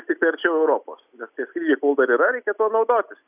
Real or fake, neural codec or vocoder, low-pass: real; none; 3.6 kHz